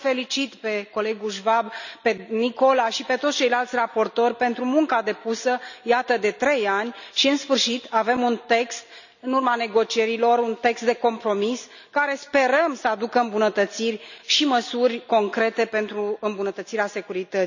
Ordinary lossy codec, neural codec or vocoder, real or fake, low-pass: none; none; real; 7.2 kHz